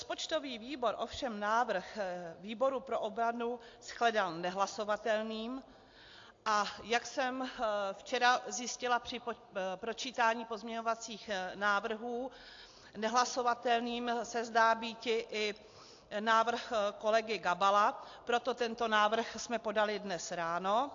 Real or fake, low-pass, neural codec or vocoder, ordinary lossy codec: real; 7.2 kHz; none; AAC, 48 kbps